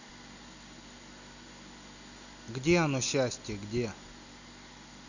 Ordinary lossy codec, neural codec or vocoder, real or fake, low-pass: none; none; real; 7.2 kHz